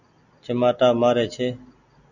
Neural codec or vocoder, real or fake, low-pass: none; real; 7.2 kHz